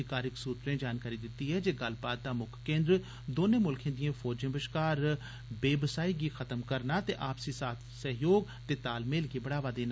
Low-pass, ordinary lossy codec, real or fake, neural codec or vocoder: none; none; real; none